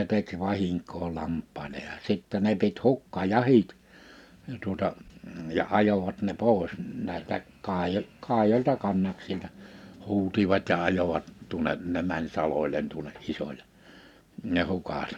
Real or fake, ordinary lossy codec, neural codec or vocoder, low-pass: real; none; none; 19.8 kHz